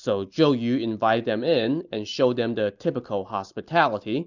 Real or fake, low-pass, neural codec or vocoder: real; 7.2 kHz; none